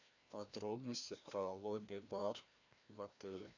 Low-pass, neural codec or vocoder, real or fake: 7.2 kHz; codec, 16 kHz, 1 kbps, FreqCodec, larger model; fake